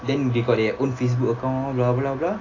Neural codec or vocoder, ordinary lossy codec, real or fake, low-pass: none; AAC, 32 kbps; real; 7.2 kHz